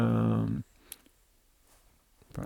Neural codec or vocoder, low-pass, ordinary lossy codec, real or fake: vocoder, 44.1 kHz, 128 mel bands, Pupu-Vocoder; 19.8 kHz; none; fake